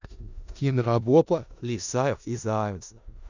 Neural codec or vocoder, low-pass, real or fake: codec, 16 kHz in and 24 kHz out, 0.4 kbps, LongCat-Audio-Codec, four codebook decoder; 7.2 kHz; fake